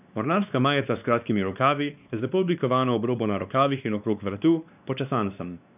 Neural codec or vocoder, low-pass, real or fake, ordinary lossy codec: codec, 16 kHz, 2 kbps, X-Codec, WavLM features, trained on Multilingual LibriSpeech; 3.6 kHz; fake; none